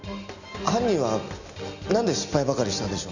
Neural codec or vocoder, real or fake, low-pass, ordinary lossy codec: none; real; 7.2 kHz; none